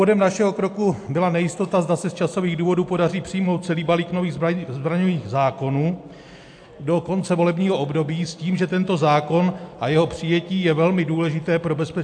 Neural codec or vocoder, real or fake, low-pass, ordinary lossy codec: none; real; 9.9 kHz; AAC, 64 kbps